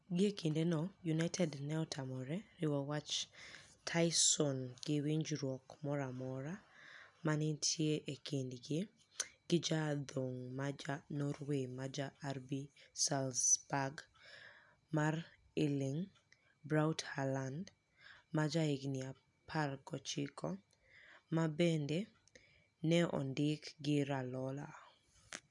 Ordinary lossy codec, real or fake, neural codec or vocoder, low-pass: none; real; none; 10.8 kHz